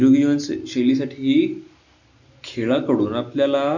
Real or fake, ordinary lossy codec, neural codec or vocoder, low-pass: real; none; none; 7.2 kHz